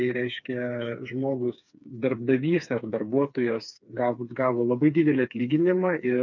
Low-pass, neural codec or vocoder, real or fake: 7.2 kHz; codec, 16 kHz, 4 kbps, FreqCodec, smaller model; fake